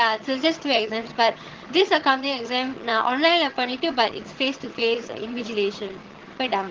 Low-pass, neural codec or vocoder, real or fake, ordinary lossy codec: 7.2 kHz; vocoder, 22.05 kHz, 80 mel bands, HiFi-GAN; fake; Opus, 16 kbps